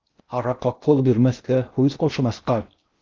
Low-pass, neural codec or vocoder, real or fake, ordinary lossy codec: 7.2 kHz; codec, 16 kHz in and 24 kHz out, 0.6 kbps, FocalCodec, streaming, 2048 codes; fake; Opus, 32 kbps